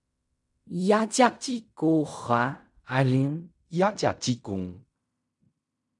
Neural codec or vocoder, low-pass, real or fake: codec, 16 kHz in and 24 kHz out, 0.4 kbps, LongCat-Audio-Codec, fine tuned four codebook decoder; 10.8 kHz; fake